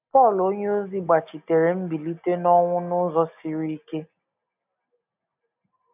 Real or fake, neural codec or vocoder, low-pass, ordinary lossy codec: real; none; 3.6 kHz; none